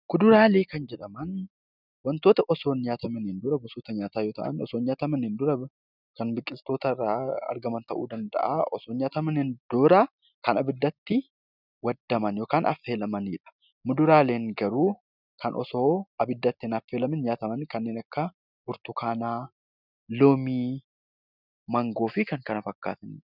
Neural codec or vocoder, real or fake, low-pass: none; real; 5.4 kHz